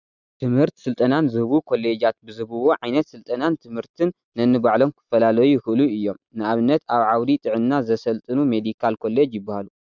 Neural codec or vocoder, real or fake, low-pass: none; real; 7.2 kHz